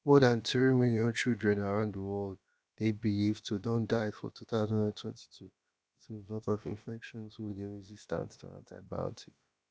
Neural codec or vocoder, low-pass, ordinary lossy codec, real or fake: codec, 16 kHz, about 1 kbps, DyCAST, with the encoder's durations; none; none; fake